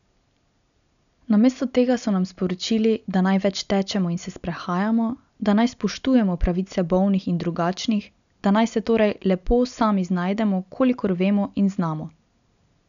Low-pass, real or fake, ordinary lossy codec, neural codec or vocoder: 7.2 kHz; real; none; none